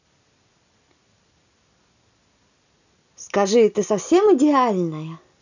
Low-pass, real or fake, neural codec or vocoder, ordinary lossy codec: 7.2 kHz; real; none; none